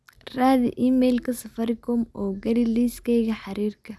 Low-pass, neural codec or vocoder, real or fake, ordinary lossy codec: none; none; real; none